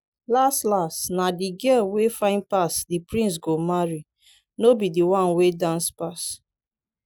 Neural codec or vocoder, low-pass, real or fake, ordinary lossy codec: none; none; real; none